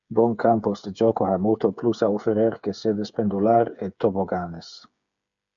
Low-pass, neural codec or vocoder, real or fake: 7.2 kHz; codec, 16 kHz, 8 kbps, FreqCodec, smaller model; fake